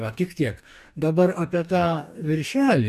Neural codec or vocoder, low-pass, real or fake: codec, 44.1 kHz, 2.6 kbps, DAC; 14.4 kHz; fake